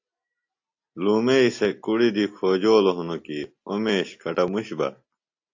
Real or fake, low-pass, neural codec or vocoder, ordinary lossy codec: real; 7.2 kHz; none; AAC, 48 kbps